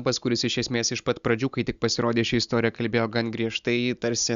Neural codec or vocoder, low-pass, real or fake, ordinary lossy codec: none; 7.2 kHz; real; Opus, 64 kbps